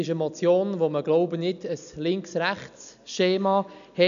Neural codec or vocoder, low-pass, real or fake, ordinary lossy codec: none; 7.2 kHz; real; MP3, 96 kbps